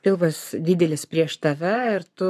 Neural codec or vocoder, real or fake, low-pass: codec, 44.1 kHz, 7.8 kbps, Pupu-Codec; fake; 14.4 kHz